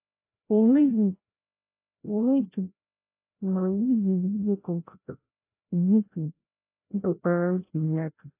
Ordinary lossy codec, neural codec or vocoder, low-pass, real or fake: AAC, 24 kbps; codec, 16 kHz, 0.5 kbps, FreqCodec, larger model; 3.6 kHz; fake